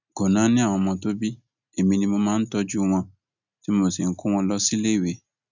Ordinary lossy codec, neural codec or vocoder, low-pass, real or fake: none; none; 7.2 kHz; real